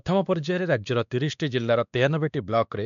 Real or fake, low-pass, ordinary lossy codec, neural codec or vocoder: fake; 7.2 kHz; none; codec, 16 kHz, 2 kbps, X-Codec, WavLM features, trained on Multilingual LibriSpeech